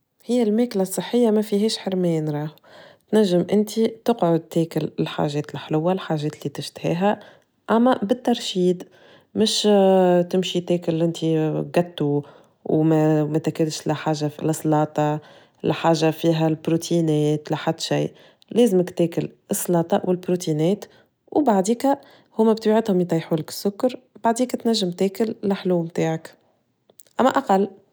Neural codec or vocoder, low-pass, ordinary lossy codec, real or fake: none; none; none; real